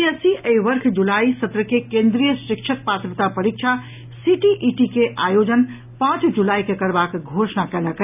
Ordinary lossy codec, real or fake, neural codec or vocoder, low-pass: none; real; none; 3.6 kHz